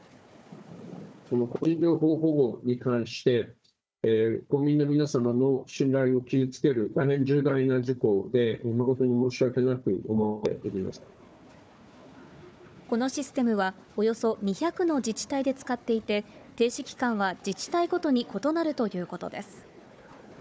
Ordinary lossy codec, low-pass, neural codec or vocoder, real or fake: none; none; codec, 16 kHz, 4 kbps, FunCodec, trained on Chinese and English, 50 frames a second; fake